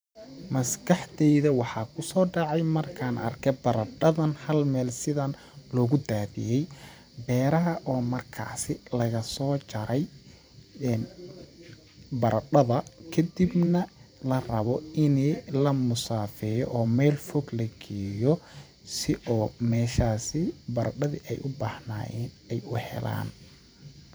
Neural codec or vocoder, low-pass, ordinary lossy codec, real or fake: vocoder, 44.1 kHz, 128 mel bands every 512 samples, BigVGAN v2; none; none; fake